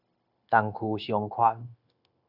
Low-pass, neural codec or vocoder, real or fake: 5.4 kHz; codec, 16 kHz, 0.9 kbps, LongCat-Audio-Codec; fake